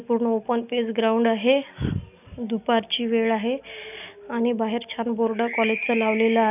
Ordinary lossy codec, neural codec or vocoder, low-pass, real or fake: none; none; 3.6 kHz; real